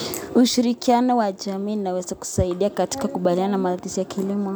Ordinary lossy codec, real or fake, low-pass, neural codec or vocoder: none; real; none; none